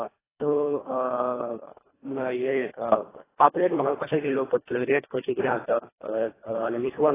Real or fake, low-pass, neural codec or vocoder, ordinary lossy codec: fake; 3.6 kHz; codec, 24 kHz, 1.5 kbps, HILCodec; AAC, 16 kbps